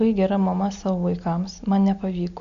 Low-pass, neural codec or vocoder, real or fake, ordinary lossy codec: 7.2 kHz; none; real; AAC, 64 kbps